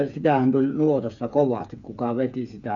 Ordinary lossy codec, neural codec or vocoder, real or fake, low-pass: none; codec, 16 kHz, 8 kbps, FreqCodec, smaller model; fake; 7.2 kHz